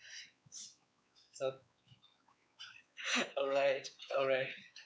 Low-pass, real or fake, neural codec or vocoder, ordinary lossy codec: none; fake; codec, 16 kHz, 4 kbps, X-Codec, WavLM features, trained on Multilingual LibriSpeech; none